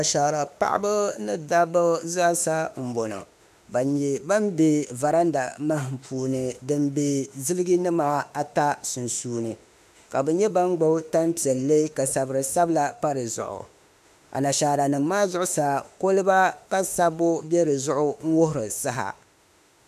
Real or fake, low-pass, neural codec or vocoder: fake; 14.4 kHz; autoencoder, 48 kHz, 32 numbers a frame, DAC-VAE, trained on Japanese speech